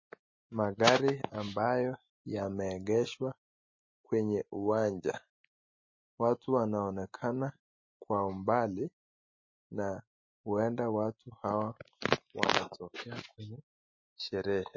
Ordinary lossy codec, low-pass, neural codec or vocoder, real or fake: MP3, 32 kbps; 7.2 kHz; none; real